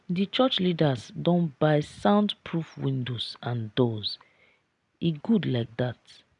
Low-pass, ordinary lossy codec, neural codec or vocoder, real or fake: 10.8 kHz; none; none; real